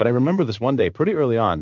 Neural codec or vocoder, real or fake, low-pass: codec, 16 kHz in and 24 kHz out, 1 kbps, XY-Tokenizer; fake; 7.2 kHz